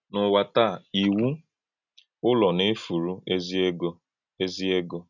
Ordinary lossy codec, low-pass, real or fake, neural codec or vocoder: none; 7.2 kHz; real; none